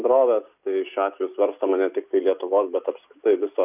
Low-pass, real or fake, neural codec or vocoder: 3.6 kHz; real; none